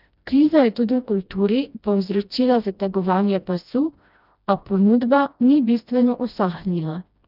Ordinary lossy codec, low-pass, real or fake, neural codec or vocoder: none; 5.4 kHz; fake; codec, 16 kHz, 1 kbps, FreqCodec, smaller model